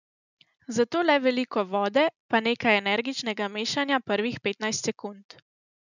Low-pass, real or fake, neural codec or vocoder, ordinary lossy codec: 7.2 kHz; real; none; none